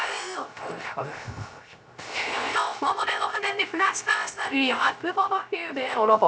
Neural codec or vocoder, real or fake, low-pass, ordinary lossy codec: codec, 16 kHz, 0.3 kbps, FocalCodec; fake; none; none